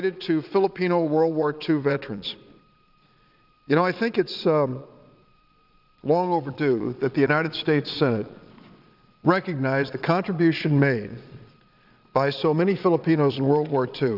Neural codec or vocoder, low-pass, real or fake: vocoder, 22.05 kHz, 80 mel bands, Vocos; 5.4 kHz; fake